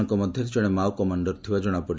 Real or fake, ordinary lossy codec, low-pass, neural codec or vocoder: real; none; none; none